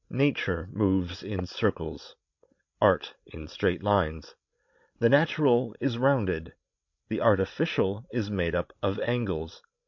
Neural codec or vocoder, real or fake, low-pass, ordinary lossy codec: codec, 16 kHz, 16 kbps, FreqCodec, larger model; fake; 7.2 kHz; MP3, 48 kbps